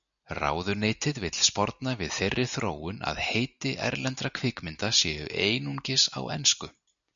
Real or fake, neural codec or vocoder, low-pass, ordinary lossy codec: real; none; 7.2 kHz; MP3, 96 kbps